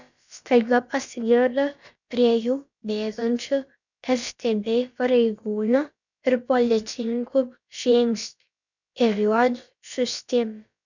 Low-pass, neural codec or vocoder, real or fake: 7.2 kHz; codec, 16 kHz, about 1 kbps, DyCAST, with the encoder's durations; fake